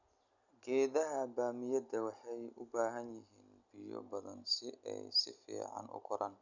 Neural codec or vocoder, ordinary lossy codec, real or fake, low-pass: none; none; real; 7.2 kHz